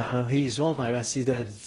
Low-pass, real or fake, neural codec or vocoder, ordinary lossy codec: 10.8 kHz; fake; codec, 16 kHz in and 24 kHz out, 0.6 kbps, FocalCodec, streaming, 4096 codes; AAC, 32 kbps